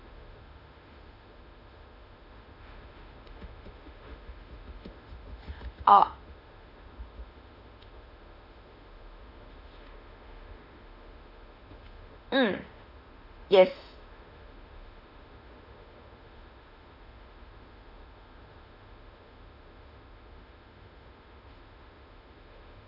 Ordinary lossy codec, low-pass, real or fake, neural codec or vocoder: none; 5.4 kHz; fake; autoencoder, 48 kHz, 32 numbers a frame, DAC-VAE, trained on Japanese speech